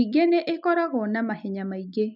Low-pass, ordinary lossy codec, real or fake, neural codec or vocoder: 5.4 kHz; none; real; none